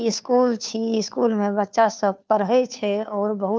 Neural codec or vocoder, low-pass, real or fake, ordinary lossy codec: codec, 16 kHz, 2 kbps, FunCodec, trained on Chinese and English, 25 frames a second; none; fake; none